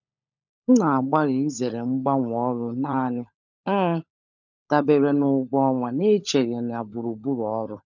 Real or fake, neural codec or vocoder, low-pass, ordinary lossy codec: fake; codec, 16 kHz, 16 kbps, FunCodec, trained on LibriTTS, 50 frames a second; 7.2 kHz; none